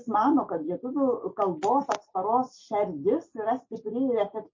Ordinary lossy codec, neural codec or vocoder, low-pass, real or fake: MP3, 32 kbps; none; 7.2 kHz; real